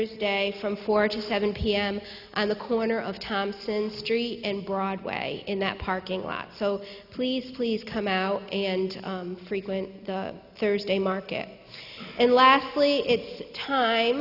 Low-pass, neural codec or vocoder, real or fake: 5.4 kHz; none; real